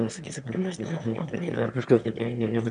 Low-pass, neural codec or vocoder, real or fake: 9.9 kHz; autoencoder, 22.05 kHz, a latent of 192 numbers a frame, VITS, trained on one speaker; fake